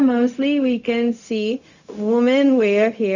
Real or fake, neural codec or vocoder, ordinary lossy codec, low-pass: fake; codec, 16 kHz, 0.4 kbps, LongCat-Audio-Codec; none; 7.2 kHz